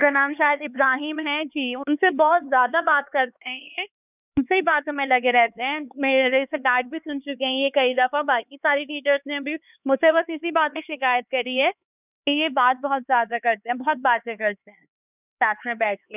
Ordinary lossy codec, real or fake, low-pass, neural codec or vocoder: none; fake; 3.6 kHz; codec, 16 kHz, 4 kbps, X-Codec, HuBERT features, trained on LibriSpeech